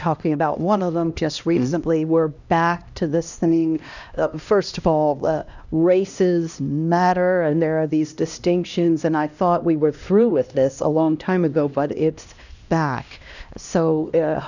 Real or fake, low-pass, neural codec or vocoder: fake; 7.2 kHz; codec, 16 kHz, 1 kbps, X-Codec, HuBERT features, trained on LibriSpeech